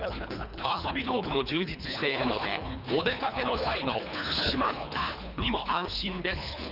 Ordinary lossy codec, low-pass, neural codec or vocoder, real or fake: none; 5.4 kHz; codec, 24 kHz, 3 kbps, HILCodec; fake